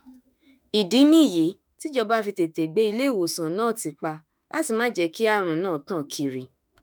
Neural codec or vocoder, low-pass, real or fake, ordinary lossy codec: autoencoder, 48 kHz, 32 numbers a frame, DAC-VAE, trained on Japanese speech; none; fake; none